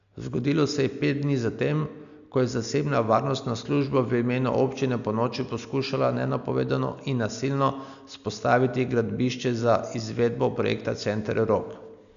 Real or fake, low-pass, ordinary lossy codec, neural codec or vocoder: real; 7.2 kHz; none; none